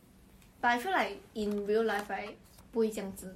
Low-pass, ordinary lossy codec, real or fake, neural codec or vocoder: 19.8 kHz; MP3, 64 kbps; real; none